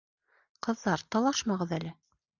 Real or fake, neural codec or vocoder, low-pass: real; none; 7.2 kHz